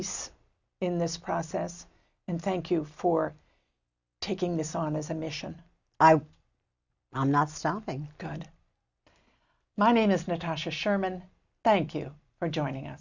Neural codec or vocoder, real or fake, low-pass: none; real; 7.2 kHz